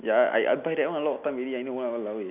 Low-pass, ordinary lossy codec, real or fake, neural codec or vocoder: 3.6 kHz; none; real; none